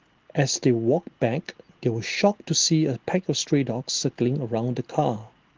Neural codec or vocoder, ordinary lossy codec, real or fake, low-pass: none; Opus, 16 kbps; real; 7.2 kHz